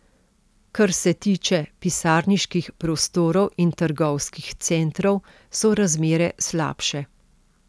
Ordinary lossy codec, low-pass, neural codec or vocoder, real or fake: none; none; none; real